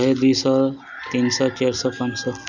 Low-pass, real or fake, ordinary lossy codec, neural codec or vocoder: 7.2 kHz; real; none; none